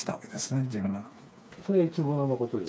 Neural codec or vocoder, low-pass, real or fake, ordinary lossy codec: codec, 16 kHz, 4 kbps, FreqCodec, smaller model; none; fake; none